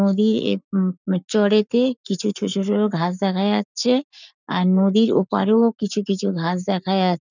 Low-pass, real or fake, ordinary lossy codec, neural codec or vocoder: 7.2 kHz; fake; none; codec, 44.1 kHz, 7.8 kbps, Pupu-Codec